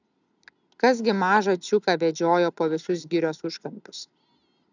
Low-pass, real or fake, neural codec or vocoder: 7.2 kHz; real; none